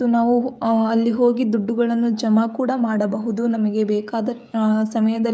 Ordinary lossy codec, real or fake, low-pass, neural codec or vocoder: none; fake; none; codec, 16 kHz, 16 kbps, FreqCodec, smaller model